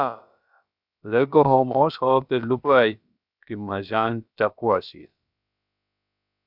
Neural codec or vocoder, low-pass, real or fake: codec, 16 kHz, about 1 kbps, DyCAST, with the encoder's durations; 5.4 kHz; fake